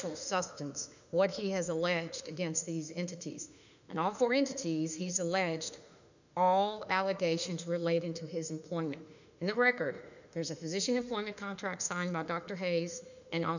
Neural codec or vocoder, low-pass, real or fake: autoencoder, 48 kHz, 32 numbers a frame, DAC-VAE, trained on Japanese speech; 7.2 kHz; fake